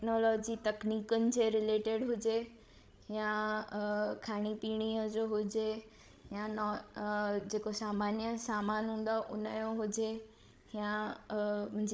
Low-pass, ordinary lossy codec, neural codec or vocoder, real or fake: none; none; codec, 16 kHz, 16 kbps, FreqCodec, larger model; fake